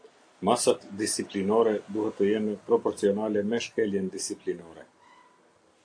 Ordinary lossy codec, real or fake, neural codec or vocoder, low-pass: AAC, 48 kbps; real; none; 9.9 kHz